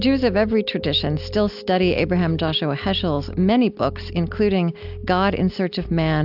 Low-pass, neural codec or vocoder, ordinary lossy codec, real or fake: 5.4 kHz; none; AAC, 48 kbps; real